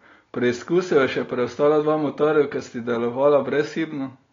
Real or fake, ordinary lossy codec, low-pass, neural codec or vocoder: real; AAC, 32 kbps; 7.2 kHz; none